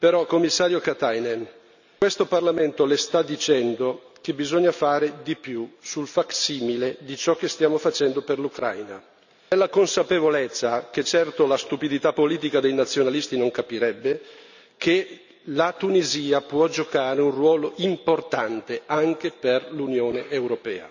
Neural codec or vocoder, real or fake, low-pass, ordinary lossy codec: none; real; 7.2 kHz; none